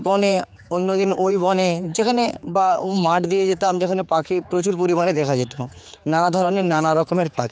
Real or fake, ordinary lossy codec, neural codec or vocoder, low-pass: fake; none; codec, 16 kHz, 4 kbps, X-Codec, HuBERT features, trained on general audio; none